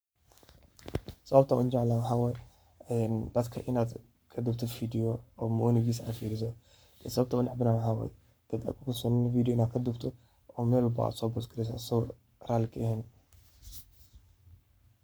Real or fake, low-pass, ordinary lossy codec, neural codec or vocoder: fake; none; none; codec, 44.1 kHz, 7.8 kbps, Pupu-Codec